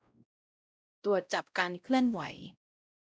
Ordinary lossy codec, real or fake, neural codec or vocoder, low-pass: none; fake; codec, 16 kHz, 0.5 kbps, X-Codec, WavLM features, trained on Multilingual LibriSpeech; none